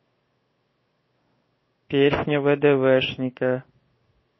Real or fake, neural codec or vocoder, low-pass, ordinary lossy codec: fake; codec, 16 kHz in and 24 kHz out, 1 kbps, XY-Tokenizer; 7.2 kHz; MP3, 24 kbps